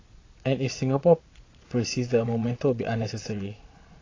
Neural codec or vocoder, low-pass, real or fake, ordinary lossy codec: vocoder, 22.05 kHz, 80 mel bands, Vocos; 7.2 kHz; fake; AAC, 32 kbps